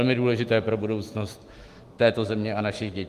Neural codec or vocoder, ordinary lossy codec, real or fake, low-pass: autoencoder, 48 kHz, 128 numbers a frame, DAC-VAE, trained on Japanese speech; Opus, 24 kbps; fake; 14.4 kHz